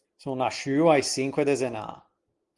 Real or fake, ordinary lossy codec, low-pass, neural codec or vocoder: real; Opus, 24 kbps; 10.8 kHz; none